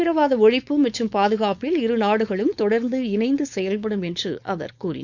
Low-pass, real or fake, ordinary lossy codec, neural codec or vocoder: 7.2 kHz; fake; none; codec, 16 kHz, 4.8 kbps, FACodec